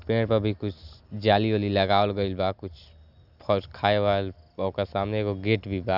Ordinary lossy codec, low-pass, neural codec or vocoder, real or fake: none; 5.4 kHz; none; real